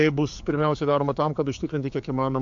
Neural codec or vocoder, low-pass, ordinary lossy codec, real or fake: codec, 16 kHz, 4 kbps, X-Codec, HuBERT features, trained on general audio; 7.2 kHz; AAC, 64 kbps; fake